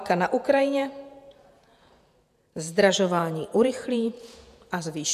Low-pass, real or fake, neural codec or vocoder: 14.4 kHz; fake; vocoder, 48 kHz, 128 mel bands, Vocos